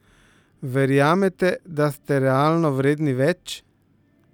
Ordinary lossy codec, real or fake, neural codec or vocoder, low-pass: none; real; none; 19.8 kHz